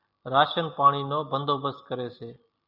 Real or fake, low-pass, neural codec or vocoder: real; 5.4 kHz; none